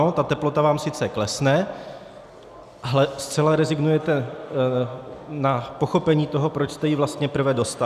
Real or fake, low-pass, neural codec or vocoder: real; 14.4 kHz; none